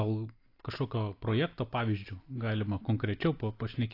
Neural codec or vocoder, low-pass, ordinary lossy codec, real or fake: none; 5.4 kHz; AAC, 32 kbps; real